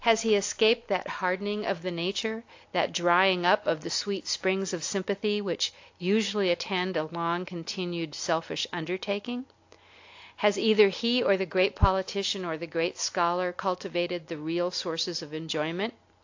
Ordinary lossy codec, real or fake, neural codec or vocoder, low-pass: AAC, 48 kbps; real; none; 7.2 kHz